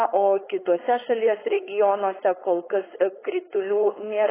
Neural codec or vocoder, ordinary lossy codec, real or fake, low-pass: codec, 16 kHz, 4.8 kbps, FACodec; AAC, 16 kbps; fake; 3.6 kHz